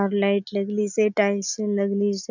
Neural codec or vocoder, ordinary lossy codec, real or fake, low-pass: none; none; real; 7.2 kHz